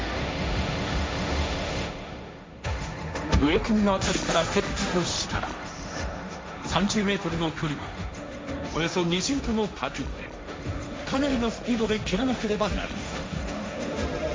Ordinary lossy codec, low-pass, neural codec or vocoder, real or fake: none; none; codec, 16 kHz, 1.1 kbps, Voila-Tokenizer; fake